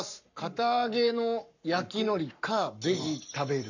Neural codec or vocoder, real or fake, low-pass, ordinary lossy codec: codec, 44.1 kHz, 7.8 kbps, Pupu-Codec; fake; 7.2 kHz; none